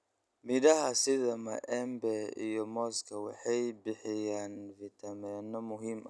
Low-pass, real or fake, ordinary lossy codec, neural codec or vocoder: none; real; none; none